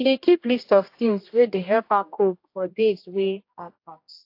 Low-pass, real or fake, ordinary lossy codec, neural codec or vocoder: 5.4 kHz; fake; AAC, 32 kbps; codec, 16 kHz, 1 kbps, X-Codec, HuBERT features, trained on general audio